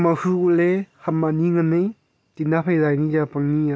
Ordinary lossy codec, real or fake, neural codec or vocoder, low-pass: none; real; none; none